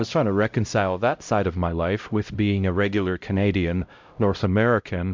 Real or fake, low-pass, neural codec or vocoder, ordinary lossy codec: fake; 7.2 kHz; codec, 16 kHz, 0.5 kbps, X-Codec, HuBERT features, trained on LibriSpeech; MP3, 64 kbps